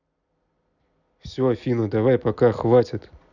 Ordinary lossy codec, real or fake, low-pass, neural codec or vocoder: none; real; 7.2 kHz; none